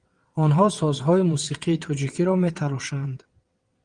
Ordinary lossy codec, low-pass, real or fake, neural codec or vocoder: Opus, 24 kbps; 9.9 kHz; fake; vocoder, 22.05 kHz, 80 mel bands, WaveNeXt